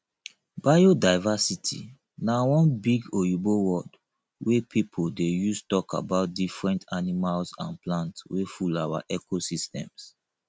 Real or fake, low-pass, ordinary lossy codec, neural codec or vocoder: real; none; none; none